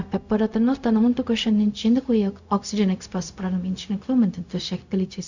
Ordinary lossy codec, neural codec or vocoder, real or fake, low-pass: none; codec, 16 kHz, 0.4 kbps, LongCat-Audio-Codec; fake; 7.2 kHz